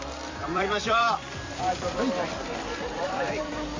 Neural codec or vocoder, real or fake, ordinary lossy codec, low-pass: vocoder, 44.1 kHz, 128 mel bands every 256 samples, BigVGAN v2; fake; MP3, 48 kbps; 7.2 kHz